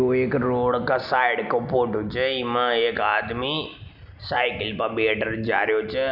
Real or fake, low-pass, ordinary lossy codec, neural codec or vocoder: real; 5.4 kHz; none; none